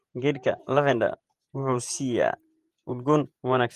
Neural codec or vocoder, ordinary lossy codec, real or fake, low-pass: vocoder, 48 kHz, 128 mel bands, Vocos; Opus, 24 kbps; fake; 14.4 kHz